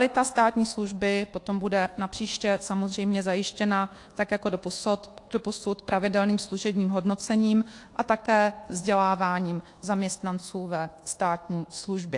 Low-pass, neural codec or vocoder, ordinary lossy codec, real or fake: 10.8 kHz; codec, 24 kHz, 1.2 kbps, DualCodec; AAC, 48 kbps; fake